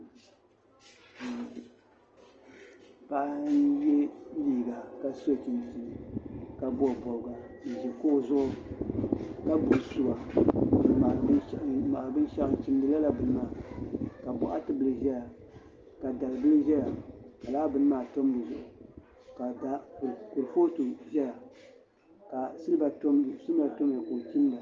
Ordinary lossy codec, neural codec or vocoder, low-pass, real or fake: Opus, 24 kbps; none; 7.2 kHz; real